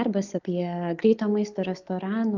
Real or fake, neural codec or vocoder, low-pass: real; none; 7.2 kHz